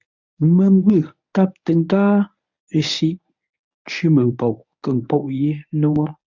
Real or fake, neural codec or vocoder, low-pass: fake; codec, 24 kHz, 0.9 kbps, WavTokenizer, medium speech release version 1; 7.2 kHz